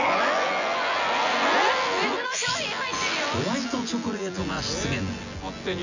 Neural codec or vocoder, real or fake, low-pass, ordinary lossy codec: vocoder, 24 kHz, 100 mel bands, Vocos; fake; 7.2 kHz; none